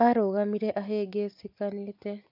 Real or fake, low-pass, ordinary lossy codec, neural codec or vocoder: real; 5.4 kHz; AAC, 48 kbps; none